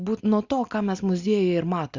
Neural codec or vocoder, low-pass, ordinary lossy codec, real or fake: none; 7.2 kHz; Opus, 64 kbps; real